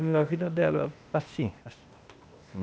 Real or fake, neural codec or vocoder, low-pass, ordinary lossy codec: fake; codec, 16 kHz, 0.8 kbps, ZipCodec; none; none